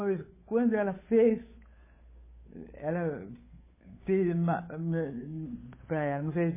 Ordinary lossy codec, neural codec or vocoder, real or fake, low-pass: MP3, 16 kbps; codec, 16 kHz, 8 kbps, FunCodec, trained on Chinese and English, 25 frames a second; fake; 3.6 kHz